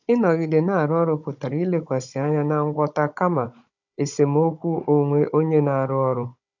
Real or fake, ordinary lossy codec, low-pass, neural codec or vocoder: fake; none; 7.2 kHz; codec, 16 kHz, 16 kbps, FunCodec, trained on Chinese and English, 50 frames a second